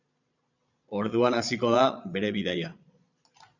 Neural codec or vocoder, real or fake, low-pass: vocoder, 22.05 kHz, 80 mel bands, Vocos; fake; 7.2 kHz